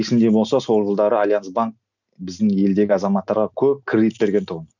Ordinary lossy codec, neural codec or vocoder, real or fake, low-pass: none; none; real; 7.2 kHz